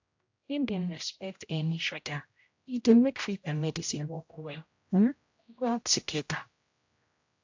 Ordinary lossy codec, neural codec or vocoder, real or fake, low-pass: AAC, 48 kbps; codec, 16 kHz, 0.5 kbps, X-Codec, HuBERT features, trained on general audio; fake; 7.2 kHz